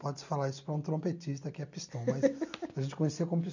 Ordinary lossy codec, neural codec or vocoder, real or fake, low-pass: none; none; real; 7.2 kHz